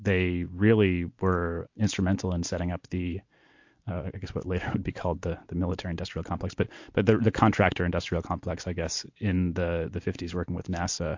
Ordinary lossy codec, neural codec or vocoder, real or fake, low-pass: MP3, 48 kbps; none; real; 7.2 kHz